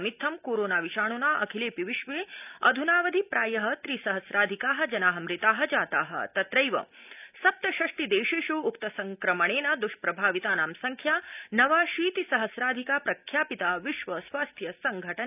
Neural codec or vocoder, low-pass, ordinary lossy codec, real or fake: none; 3.6 kHz; none; real